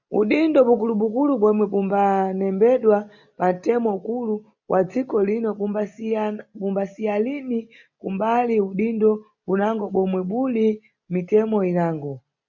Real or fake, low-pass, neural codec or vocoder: real; 7.2 kHz; none